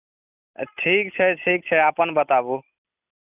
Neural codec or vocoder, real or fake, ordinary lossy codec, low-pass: none; real; none; 3.6 kHz